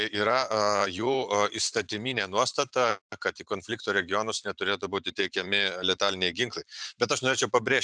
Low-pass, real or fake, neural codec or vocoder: 9.9 kHz; fake; vocoder, 44.1 kHz, 128 mel bands every 512 samples, BigVGAN v2